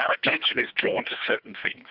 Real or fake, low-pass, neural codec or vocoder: fake; 5.4 kHz; codec, 24 kHz, 1.5 kbps, HILCodec